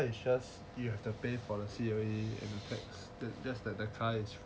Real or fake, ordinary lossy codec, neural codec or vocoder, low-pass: real; none; none; none